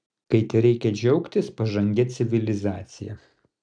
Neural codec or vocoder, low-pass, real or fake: none; 9.9 kHz; real